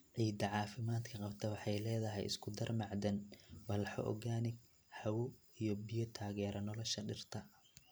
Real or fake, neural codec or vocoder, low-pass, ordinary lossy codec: real; none; none; none